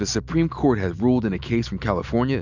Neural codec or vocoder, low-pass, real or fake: none; 7.2 kHz; real